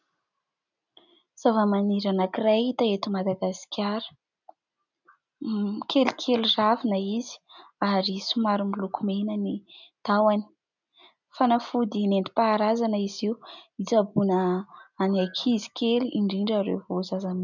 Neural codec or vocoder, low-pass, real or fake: none; 7.2 kHz; real